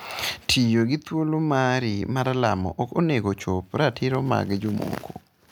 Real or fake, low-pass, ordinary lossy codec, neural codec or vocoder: real; none; none; none